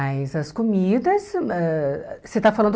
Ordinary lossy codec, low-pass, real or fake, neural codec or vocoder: none; none; real; none